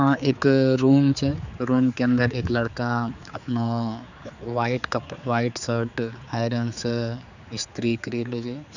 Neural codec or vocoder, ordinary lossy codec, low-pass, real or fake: codec, 16 kHz, 4 kbps, X-Codec, HuBERT features, trained on general audio; none; 7.2 kHz; fake